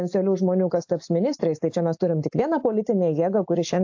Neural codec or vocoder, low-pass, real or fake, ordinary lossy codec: none; 7.2 kHz; real; AAC, 48 kbps